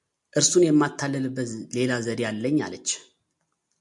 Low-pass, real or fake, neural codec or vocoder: 10.8 kHz; real; none